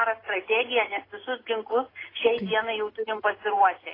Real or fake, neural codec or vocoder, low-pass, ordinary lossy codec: real; none; 5.4 kHz; AAC, 24 kbps